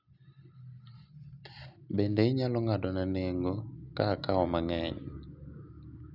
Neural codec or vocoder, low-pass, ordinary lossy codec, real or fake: none; 5.4 kHz; none; real